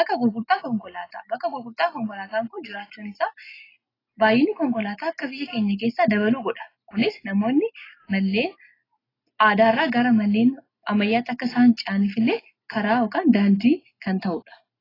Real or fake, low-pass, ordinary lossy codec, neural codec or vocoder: real; 5.4 kHz; AAC, 24 kbps; none